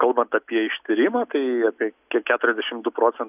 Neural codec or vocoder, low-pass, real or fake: none; 3.6 kHz; real